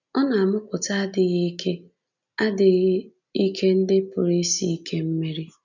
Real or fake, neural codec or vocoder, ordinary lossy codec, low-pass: real; none; none; 7.2 kHz